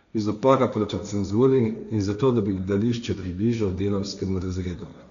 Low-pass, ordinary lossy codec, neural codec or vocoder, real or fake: 7.2 kHz; none; codec, 16 kHz, 1.1 kbps, Voila-Tokenizer; fake